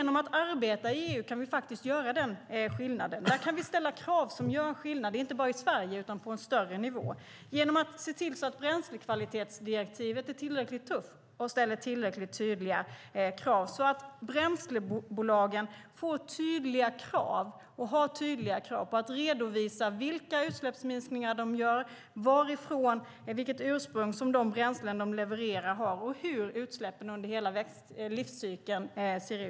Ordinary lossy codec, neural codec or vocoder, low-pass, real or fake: none; none; none; real